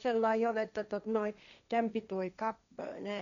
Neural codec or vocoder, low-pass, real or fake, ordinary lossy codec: codec, 16 kHz, 1.1 kbps, Voila-Tokenizer; 7.2 kHz; fake; none